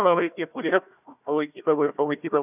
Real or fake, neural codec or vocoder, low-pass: fake; codec, 16 kHz, 1 kbps, FunCodec, trained on Chinese and English, 50 frames a second; 3.6 kHz